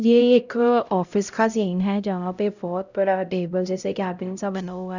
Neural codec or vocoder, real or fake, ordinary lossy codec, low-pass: codec, 16 kHz, 0.5 kbps, X-Codec, HuBERT features, trained on LibriSpeech; fake; none; 7.2 kHz